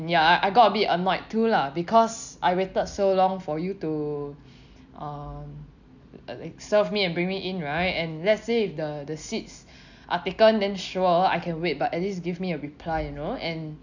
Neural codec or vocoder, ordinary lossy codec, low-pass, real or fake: none; none; 7.2 kHz; real